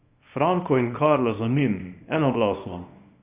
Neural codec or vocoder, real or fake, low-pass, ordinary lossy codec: codec, 24 kHz, 0.9 kbps, WavTokenizer, small release; fake; 3.6 kHz; Opus, 64 kbps